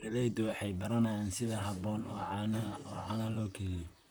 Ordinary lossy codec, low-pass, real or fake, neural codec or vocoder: none; none; fake; vocoder, 44.1 kHz, 128 mel bands, Pupu-Vocoder